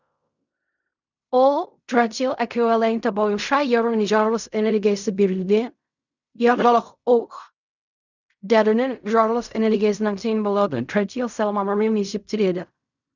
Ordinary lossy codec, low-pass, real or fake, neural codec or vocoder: none; 7.2 kHz; fake; codec, 16 kHz in and 24 kHz out, 0.4 kbps, LongCat-Audio-Codec, fine tuned four codebook decoder